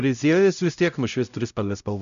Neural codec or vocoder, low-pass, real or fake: codec, 16 kHz, 0.5 kbps, X-Codec, HuBERT features, trained on LibriSpeech; 7.2 kHz; fake